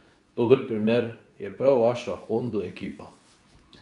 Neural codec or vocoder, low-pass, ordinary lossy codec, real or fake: codec, 24 kHz, 0.9 kbps, WavTokenizer, medium speech release version 2; 10.8 kHz; none; fake